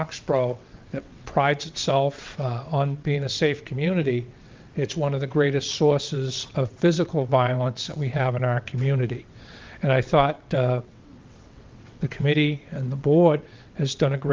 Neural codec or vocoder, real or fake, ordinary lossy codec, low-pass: vocoder, 22.05 kHz, 80 mel bands, WaveNeXt; fake; Opus, 24 kbps; 7.2 kHz